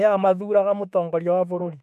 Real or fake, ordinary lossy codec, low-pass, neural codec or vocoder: fake; none; 14.4 kHz; autoencoder, 48 kHz, 32 numbers a frame, DAC-VAE, trained on Japanese speech